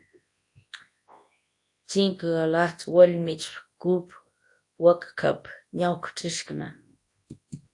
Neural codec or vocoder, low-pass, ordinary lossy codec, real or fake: codec, 24 kHz, 0.9 kbps, WavTokenizer, large speech release; 10.8 kHz; AAC, 48 kbps; fake